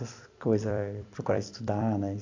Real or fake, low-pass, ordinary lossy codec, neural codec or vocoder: fake; 7.2 kHz; AAC, 48 kbps; vocoder, 44.1 kHz, 128 mel bands every 256 samples, BigVGAN v2